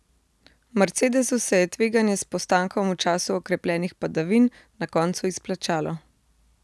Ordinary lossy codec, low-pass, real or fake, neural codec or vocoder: none; none; real; none